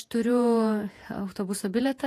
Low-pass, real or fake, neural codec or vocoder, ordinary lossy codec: 14.4 kHz; fake; vocoder, 48 kHz, 128 mel bands, Vocos; AAC, 48 kbps